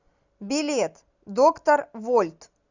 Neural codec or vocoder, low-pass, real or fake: none; 7.2 kHz; real